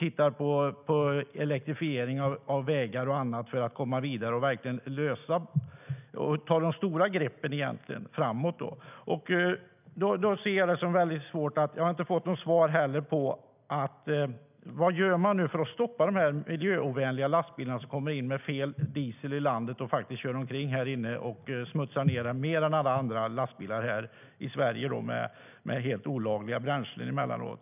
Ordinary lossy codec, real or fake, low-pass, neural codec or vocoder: none; real; 3.6 kHz; none